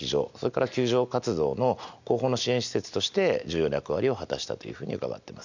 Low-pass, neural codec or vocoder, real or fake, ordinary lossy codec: 7.2 kHz; none; real; none